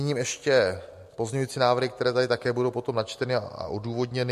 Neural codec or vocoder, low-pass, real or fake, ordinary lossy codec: none; 14.4 kHz; real; MP3, 64 kbps